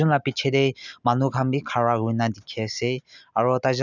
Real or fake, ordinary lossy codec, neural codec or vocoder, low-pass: real; none; none; 7.2 kHz